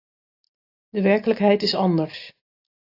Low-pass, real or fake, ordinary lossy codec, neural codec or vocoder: 5.4 kHz; real; AAC, 32 kbps; none